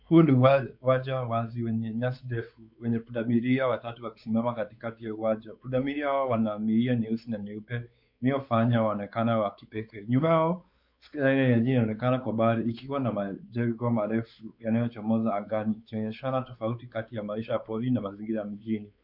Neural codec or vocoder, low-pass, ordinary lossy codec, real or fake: codec, 16 kHz, 4 kbps, X-Codec, WavLM features, trained on Multilingual LibriSpeech; 5.4 kHz; MP3, 48 kbps; fake